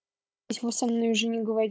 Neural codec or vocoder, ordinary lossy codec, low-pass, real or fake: codec, 16 kHz, 16 kbps, FunCodec, trained on Chinese and English, 50 frames a second; none; none; fake